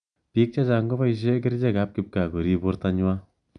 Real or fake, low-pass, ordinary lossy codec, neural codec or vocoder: real; 9.9 kHz; none; none